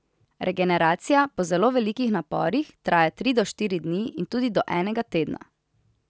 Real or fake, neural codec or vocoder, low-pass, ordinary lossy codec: real; none; none; none